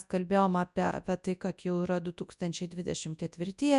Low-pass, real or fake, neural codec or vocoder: 10.8 kHz; fake; codec, 24 kHz, 0.9 kbps, WavTokenizer, large speech release